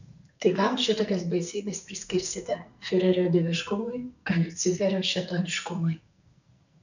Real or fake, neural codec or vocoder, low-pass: fake; codec, 44.1 kHz, 2.6 kbps, SNAC; 7.2 kHz